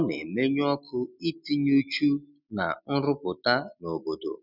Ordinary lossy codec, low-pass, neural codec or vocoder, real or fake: none; 5.4 kHz; none; real